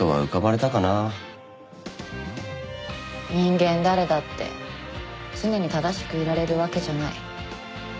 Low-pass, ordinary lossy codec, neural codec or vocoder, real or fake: none; none; none; real